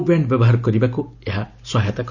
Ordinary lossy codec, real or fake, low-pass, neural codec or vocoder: none; real; 7.2 kHz; none